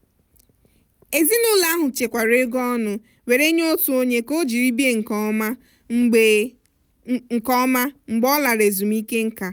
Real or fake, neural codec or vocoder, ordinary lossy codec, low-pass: real; none; none; none